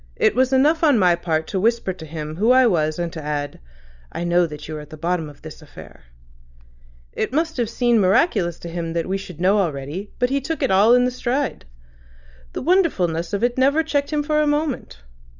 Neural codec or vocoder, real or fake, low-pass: none; real; 7.2 kHz